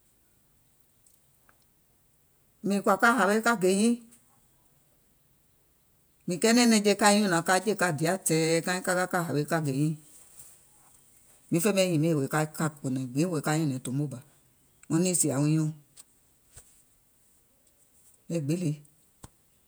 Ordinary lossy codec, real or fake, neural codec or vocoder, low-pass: none; fake; vocoder, 48 kHz, 128 mel bands, Vocos; none